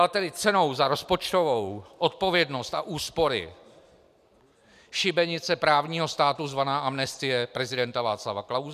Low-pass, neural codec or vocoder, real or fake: 14.4 kHz; none; real